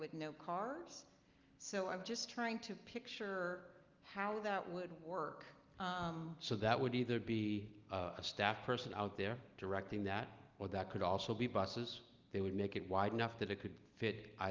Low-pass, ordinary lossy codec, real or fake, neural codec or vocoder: 7.2 kHz; Opus, 32 kbps; real; none